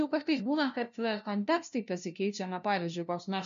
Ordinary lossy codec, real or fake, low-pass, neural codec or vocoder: MP3, 64 kbps; fake; 7.2 kHz; codec, 16 kHz, 0.5 kbps, FunCodec, trained on LibriTTS, 25 frames a second